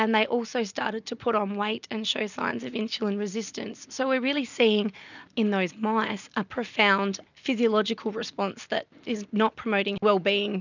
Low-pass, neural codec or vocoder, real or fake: 7.2 kHz; none; real